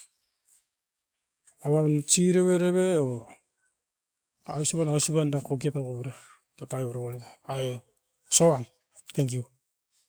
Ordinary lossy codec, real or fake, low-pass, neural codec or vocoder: none; fake; none; codec, 44.1 kHz, 7.8 kbps, DAC